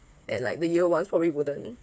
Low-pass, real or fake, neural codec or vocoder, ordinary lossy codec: none; fake; codec, 16 kHz, 4 kbps, FreqCodec, smaller model; none